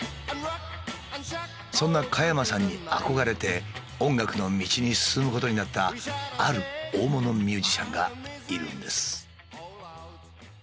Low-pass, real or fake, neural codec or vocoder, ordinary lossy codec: none; real; none; none